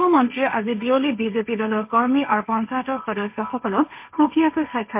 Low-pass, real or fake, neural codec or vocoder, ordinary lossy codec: 3.6 kHz; fake; codec, 16 kHz, 1.1 kbps, Voila-Tokenizer; MP3, 32 kbps